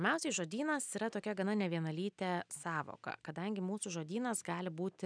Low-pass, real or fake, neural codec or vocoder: 9.9 kHz; real; none